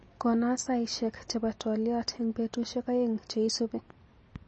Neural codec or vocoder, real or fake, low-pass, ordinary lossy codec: none; real; 10.8 kHz; MP3, 32 kbps